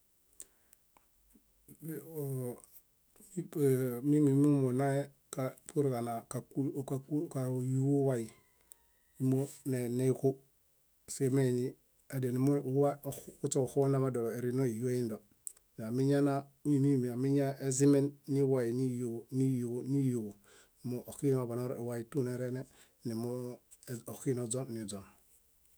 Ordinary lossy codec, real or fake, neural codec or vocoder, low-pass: none; fake; autoencoder, 48 kHz, 128 numbers a frame, DAC-VAE, trained on Japanese speech; none